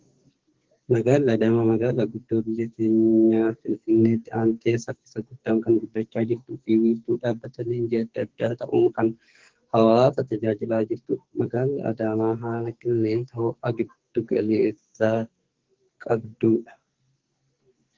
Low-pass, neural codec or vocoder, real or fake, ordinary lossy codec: 7.2 kHz; codec, 44.1 kHz, 2.6 kbps, SNAC; fake; Opus, 16 kbps